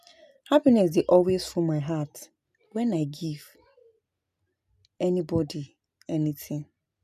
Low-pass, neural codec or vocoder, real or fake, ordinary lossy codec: 14.4 kHz; none; real; none